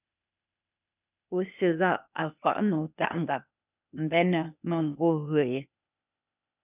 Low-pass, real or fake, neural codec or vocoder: 3.6 kHz; fake; codec, 16 kHz, 0.8 kbps, ZipCodec